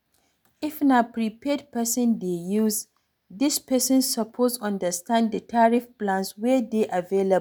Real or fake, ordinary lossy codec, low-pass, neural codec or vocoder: real; none; none; none